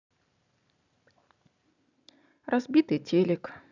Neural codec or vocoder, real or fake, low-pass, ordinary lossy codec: none; real; 7.2 kHz; none